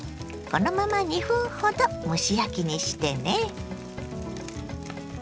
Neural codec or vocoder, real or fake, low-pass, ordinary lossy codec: none; real; none; none